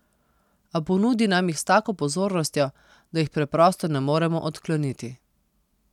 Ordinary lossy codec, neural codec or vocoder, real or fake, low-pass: none; none; real; 19.8 kHz